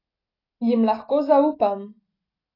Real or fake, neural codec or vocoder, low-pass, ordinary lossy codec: real; none; 5.4 kHz; AAC, 24 kbps